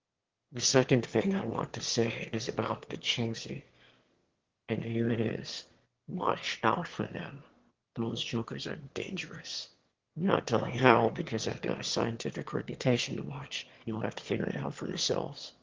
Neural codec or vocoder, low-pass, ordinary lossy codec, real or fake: autoencoder, 22.05 kHz, a latent of 192 numbers a frame, VITS, trained on one speaker; 7.2 kHz; Opus, 16 kbps; fake